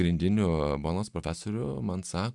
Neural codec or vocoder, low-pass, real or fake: none; 10.8 kHz; real